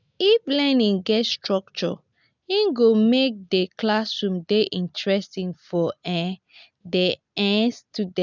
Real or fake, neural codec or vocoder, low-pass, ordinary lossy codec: real; none; 7.2 kHz; none